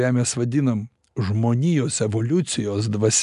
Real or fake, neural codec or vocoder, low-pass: real; none; 10.8 kHz